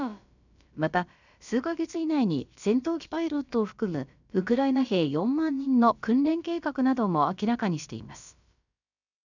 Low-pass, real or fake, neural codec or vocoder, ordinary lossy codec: 7.2 kHz; fake; codec, 16 kHz, about 1 kbps, DyCAST, with the encoder's durations; none